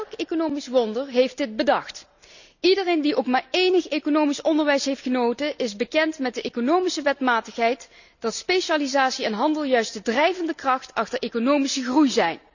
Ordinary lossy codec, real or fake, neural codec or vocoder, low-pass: none; real; none; 7.2 kHz